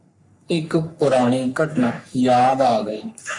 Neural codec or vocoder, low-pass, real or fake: codec, 44.1 kHz, 3.4 kbps, Pupu-Codec; 10.8 kHz; fake